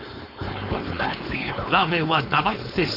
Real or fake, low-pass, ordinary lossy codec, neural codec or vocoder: fake; 5.4 kHz; none; codec, 16 kHz, 4.8 kbps, FACodec